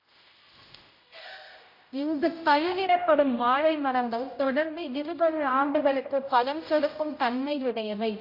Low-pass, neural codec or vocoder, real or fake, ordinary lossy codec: 5.4 kHz; codec, 16 kHz, 0.5 kbps, X-Codec, HuBERT features, trained on general audio; fake; MP3, 32 kbps